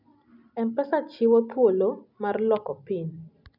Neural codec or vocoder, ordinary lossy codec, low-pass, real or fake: none; none; 5.4 kHz; real